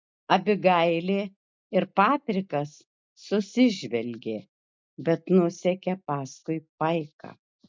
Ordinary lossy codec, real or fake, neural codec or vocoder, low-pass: MP3, 64 kbps; real; none; 7.2 kHz